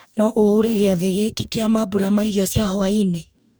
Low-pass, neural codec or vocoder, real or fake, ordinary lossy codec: none; codec, 44.1 kHz, 2.6 kbps, DAC; fake; none